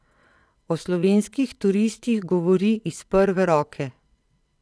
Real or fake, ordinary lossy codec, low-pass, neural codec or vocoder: fake; none; none; vocoder, 22.05 kHz, 80 mel bands, WaveNeXt